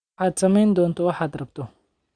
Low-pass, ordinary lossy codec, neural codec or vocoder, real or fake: 9.9 kHz; Opus, 64 kbps; none; real